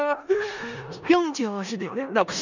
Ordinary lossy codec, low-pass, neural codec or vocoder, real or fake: none; 7.2 kHz; codec, 16 kHz in and 24 kHz out, 0.4 kbps, LongCat-Audio-Codec, four codebook decoder; fake